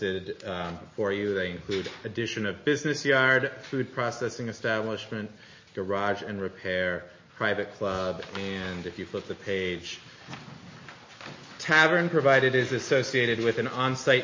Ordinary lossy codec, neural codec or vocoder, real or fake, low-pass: MP3, 32 kbps; none; real; 7.2 kHz